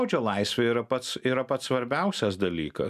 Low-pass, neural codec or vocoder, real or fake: 14.4 kHz; none; real